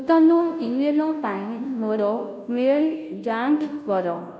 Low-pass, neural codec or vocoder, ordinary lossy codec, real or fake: none; codec, 16 kHz, 0.5 kbps, FunCodec, trained on Chinese and English, 25 frames a second; none; fake